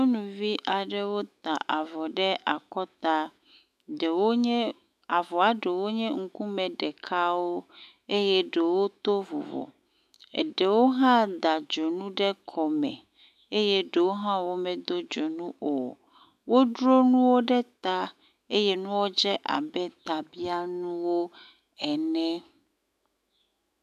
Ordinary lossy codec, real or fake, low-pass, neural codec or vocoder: MP3, 96 kbps; fake; 14.4 kHz; autoencoder, 48 kHz, 128 numbers a frame, DAC-VAE, trained on Japanese speech